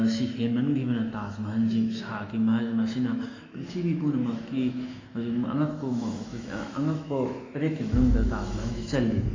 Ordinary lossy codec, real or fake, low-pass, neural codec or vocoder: AAC, 32 kbps; fake; 7.2 kHz; autoencoder, 48 kHz, 128 numbers a frame, DAC-VAE, trained on Japanese speech